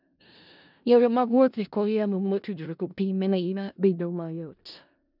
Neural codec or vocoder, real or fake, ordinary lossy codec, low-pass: codec, 16 kHz in and 24 kHz out, 0.4 kbps, LongCat-Audio-Codec, four codebook decoder; fake; none; 5.4 kHz